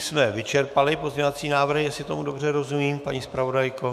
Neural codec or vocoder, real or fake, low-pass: autoencoder, 48 kHz, 128 numbers a frame, DAC-VAE, trained on Japanese speech; fake; 14.4 kHz